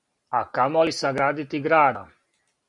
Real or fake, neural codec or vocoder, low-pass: real; none; 10.8 kHz